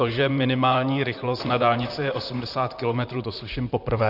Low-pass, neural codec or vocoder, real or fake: 5.4 kHz; vocoder, 44.1 kHz, 128 mel bands, Pupu-Vocoder; fake